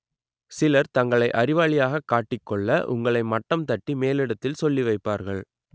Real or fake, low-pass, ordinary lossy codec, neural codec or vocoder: real; none; none; none